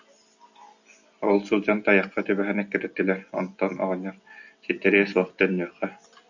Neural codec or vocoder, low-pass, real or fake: none; 7.2 kHz; real